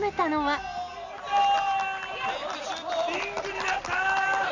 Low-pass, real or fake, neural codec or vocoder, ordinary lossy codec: 7.2 kHz; real; none; Opus, 64 kbps